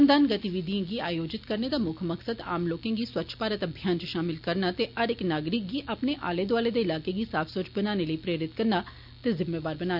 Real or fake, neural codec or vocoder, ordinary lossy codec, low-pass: real; none; none; 5.4 kHz